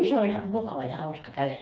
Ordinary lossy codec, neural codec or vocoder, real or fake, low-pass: none; codec, 16 kHz, 2 kbps, FreqCodec, smaller model; fake; none